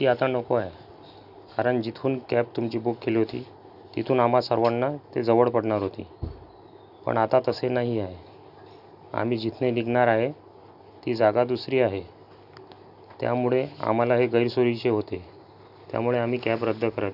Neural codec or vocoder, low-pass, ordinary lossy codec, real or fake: none; 5.4 kHz; none; real